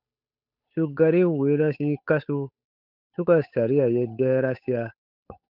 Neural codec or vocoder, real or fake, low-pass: codec, 16 kHz, 8 kbps, FunCodec, trained on Chinese and English, 25 frames a second; fake; 5.4 kHz